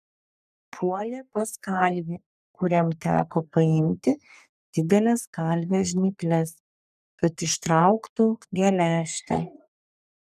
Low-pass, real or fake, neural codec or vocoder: 14.4 kHz; fake; codec, 44.1 kHz, 3.4 kbps, Pupu-Codec